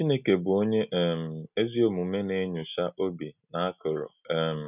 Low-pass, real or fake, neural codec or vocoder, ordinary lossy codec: 3.6 kHz; real; none; none